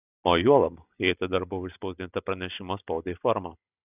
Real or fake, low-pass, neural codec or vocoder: real; 3.6 kHz; none